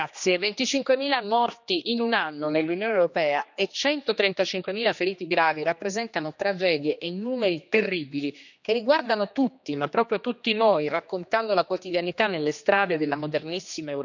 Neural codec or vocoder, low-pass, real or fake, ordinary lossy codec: codec, 16 kHz, 2 kbps, X-Codec, HuBERT features, trained on general audio; 7.2 kHz; fake; none